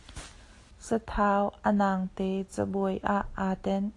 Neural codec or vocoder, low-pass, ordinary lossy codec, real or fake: none; 10.8 kHz; AAC, 48 kbps; real